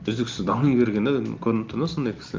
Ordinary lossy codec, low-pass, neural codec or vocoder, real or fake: Opus, 24 kbps; 7.2 kHz; none; real